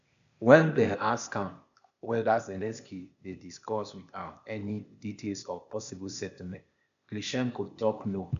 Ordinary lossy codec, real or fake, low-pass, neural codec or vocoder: none; fake; 7.2 kHz; codec, 16 kHz, 0.8 kbps, ZipCodec